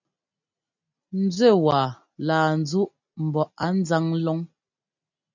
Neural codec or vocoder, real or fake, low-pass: none; real; 7.2 kHz